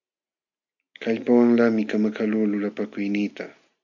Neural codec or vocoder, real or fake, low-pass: none; real; 7.2 kHz